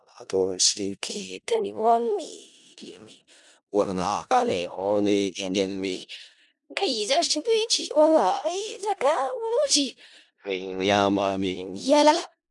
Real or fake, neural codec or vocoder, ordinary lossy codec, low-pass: fake; codec, 16 kHz in and 24 kHz out, 0.4 kbps, LongCat-Audio-Codec, four codebook decoder; none; 10.8 kHz